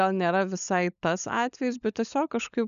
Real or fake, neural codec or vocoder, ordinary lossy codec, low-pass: fake; codec, 16 kHz, 16 kbps, FunCodec, trained on LibriTTS, 50 frames a second; MP3, 96 kbps; 7.2 kHz